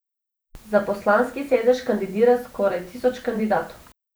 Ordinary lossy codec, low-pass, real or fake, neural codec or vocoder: none; none; fake; vocoder, 44.1 kHz, 128 mel bands every 512 samples, BigVGAN v2